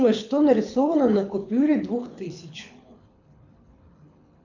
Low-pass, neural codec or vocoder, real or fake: 7.2 kHz; codec, 24 kHz, 6 kbps, HILCodec; fake